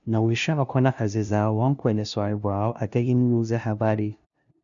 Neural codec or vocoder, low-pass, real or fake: codec, 16 kHz, 0.5 kbps, FunCodec, trained on LibriTTS, 25 frames a second; 7.2 kHz; fake